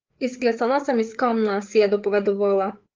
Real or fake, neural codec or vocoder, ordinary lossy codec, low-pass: fake; codec, 16 kHz, 4 kbps, FreqCodec, larger model; Opus, 24 kbps; 7.2 kHz